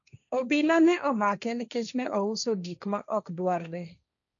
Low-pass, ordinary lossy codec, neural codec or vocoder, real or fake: 7.2 kHz; none; codec, 16 kHz, 1.1 kbps, Voila-Tokenizer; fake